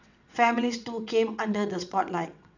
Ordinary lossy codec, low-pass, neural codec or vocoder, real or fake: none; 7.2 kHz; vocoder, 22.05 kHz, 80 mel bands, WaveNeXt; fake